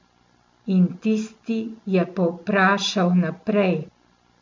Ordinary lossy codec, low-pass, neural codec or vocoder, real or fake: MP3, 64 kbps; 7.2 kHz; none; real